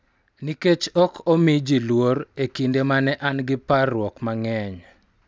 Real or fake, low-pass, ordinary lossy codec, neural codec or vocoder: real; none; none; none